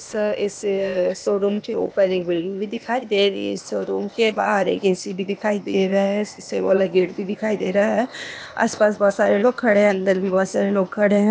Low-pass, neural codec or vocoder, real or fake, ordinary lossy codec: none; codec, 16 kHz, 0.8 kbps, ZipCodec; fake; none